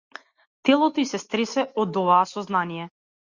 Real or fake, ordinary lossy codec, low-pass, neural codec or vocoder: real; Opus, 64 kbps; 7.2 kHz; none